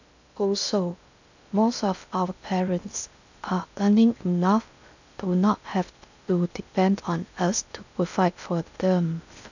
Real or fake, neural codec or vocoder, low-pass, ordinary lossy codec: fake; codec, 16 kHz in and 24 kHz out, 0.6 kbps, FocalCodec, streaming, 2048 codes; 7.2 kHz; none